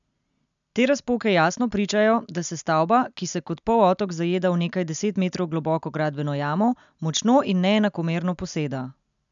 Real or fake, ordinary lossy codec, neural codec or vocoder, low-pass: real; MP3, 96 kbps; none; 7.2 kHz